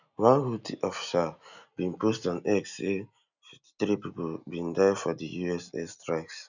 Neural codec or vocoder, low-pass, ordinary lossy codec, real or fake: none; 7.2 kHz; none; real